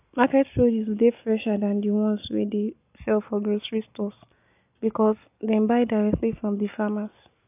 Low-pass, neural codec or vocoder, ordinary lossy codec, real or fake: 3.6 kHz; none; AAC, 32 kbps; real